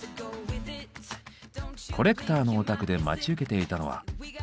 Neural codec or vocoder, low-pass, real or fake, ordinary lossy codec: none; none; real; none